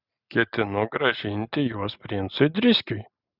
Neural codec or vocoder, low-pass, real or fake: none; 5.4 kHz; real